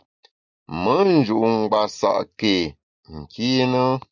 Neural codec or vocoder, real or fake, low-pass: none; real; 7.2 kHz